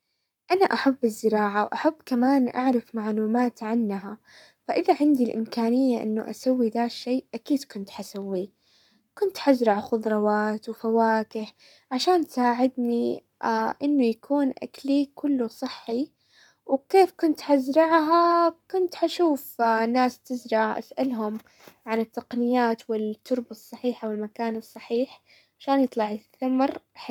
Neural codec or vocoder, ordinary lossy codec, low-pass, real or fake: codec, 44.1 kHz, 7.8 kbps, Pupu-Codec; none; 19.8 kHz; fake